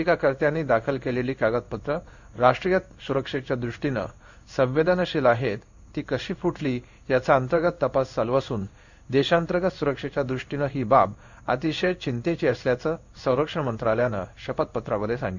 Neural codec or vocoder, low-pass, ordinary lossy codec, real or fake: codec, 16 kHz in and 24 kHz out, 1 kbps, XY-Tokenizer; 7.2 kHz; none; fake